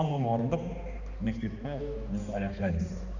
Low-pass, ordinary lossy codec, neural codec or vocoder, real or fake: 7.2 kHz; none; codec, 16 kHz, 2 kbps, X-Codec, HuBERT features, trained on balanced general audio; fake